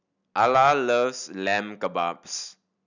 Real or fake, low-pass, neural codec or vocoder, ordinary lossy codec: real; 7.2 kHz; none; none